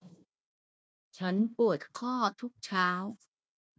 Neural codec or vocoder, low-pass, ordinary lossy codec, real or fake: codec, 16 kHz, 1 kbps, FunCodec, trained on Chinese and English, 50 frames a second; none; none; fake